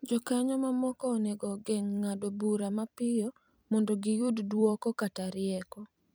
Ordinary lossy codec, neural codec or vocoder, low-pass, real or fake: none; vocoder, 44.1 kHz, 128 mel bands every 256 samples, BigVGAN v2; none; fake